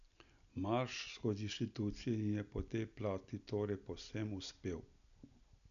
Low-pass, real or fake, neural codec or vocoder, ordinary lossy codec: 7.2 kHz; real; none; none